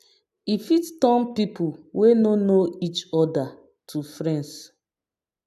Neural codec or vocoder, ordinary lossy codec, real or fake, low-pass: none; AAC, 96 kbps; real; 14.4 kHz